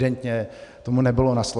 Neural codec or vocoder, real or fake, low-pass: none; real; 10.8 kHz